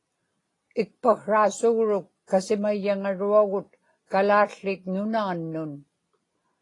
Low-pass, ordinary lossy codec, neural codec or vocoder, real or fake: 10.8 kHz; AAC, 32 kbps; none; real